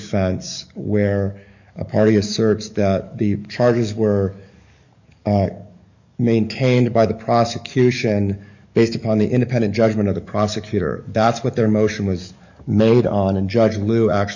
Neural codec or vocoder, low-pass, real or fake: codec, 44.1 kHz, 7.8 kbps, DAC; 7.2 kHz; fake